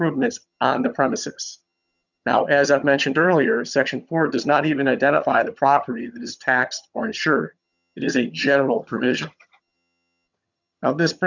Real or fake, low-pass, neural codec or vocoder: fake; 7.2 kHz; vocoder, 22.05 kHz, 80 mel bands, HiFi-GAN